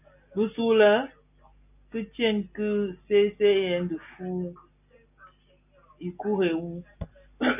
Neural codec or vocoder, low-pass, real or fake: none; 3.6 kHz; real